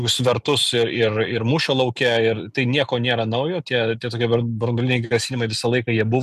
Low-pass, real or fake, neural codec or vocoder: 14.4 kHz; real; none